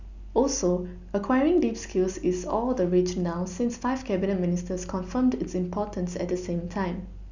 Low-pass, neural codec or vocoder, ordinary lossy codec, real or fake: 7.2 kHz; none; none; real